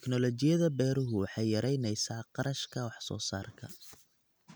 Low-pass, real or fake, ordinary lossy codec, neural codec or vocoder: none; real; none; none